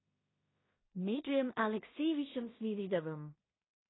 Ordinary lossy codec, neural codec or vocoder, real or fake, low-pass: AAC, 16 kbps; codec, 16 kHz in and 24 kHz out, 0.4 kbps, LongCat-Audio-Codec, two codebook decoder; fake; 7.2 kHz